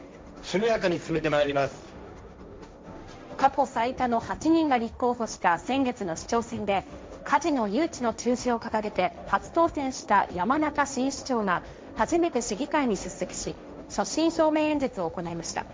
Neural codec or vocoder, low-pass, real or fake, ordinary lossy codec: codec, 16 kHz, 1.1 kbps, Voila-Tokenizer; none; fake; none